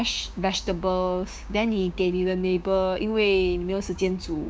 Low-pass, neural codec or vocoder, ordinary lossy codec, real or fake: none; codec, 16 kHz, 6 kbps, DAC; none; fake